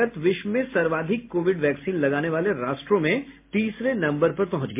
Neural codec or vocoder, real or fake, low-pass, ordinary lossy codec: none; real; 3.6 kHz; MP3, 24 kbps